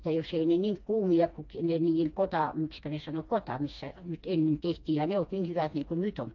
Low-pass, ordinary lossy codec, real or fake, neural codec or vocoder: 7.2 kHz; AAC, 48 kbps; fake; codec, 16 kHz, 2 kbps, FreqCodec, smaller model